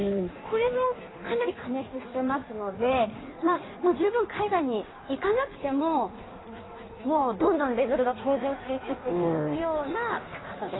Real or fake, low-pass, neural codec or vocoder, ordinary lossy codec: fake; 7.2 kHz; codec, 16 kHz in and 24 kHz out, 1.1 kbps, FireRedTTS-2 codec; AAC, 16 kbps